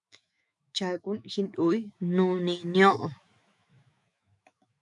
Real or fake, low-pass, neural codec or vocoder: fake; 10.8 kHz; autoencoder, 48 kHz, 128 numbers a frame, DAC-VAE, trained on Japanese speech